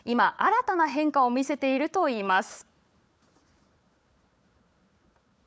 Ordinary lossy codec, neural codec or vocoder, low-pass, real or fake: none; codec, 16 kHz, 4 kbps, FunCodec, trained on Chinese and English, 50 frames a second; none; fake